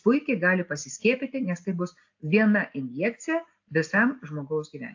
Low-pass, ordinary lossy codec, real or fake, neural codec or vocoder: 7.2 kHz; AAC, 48 kbps; real; none